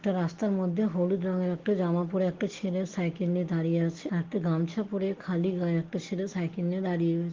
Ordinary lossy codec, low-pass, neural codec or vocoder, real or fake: Opus, 16 kbps; 7.2 kHz; none; real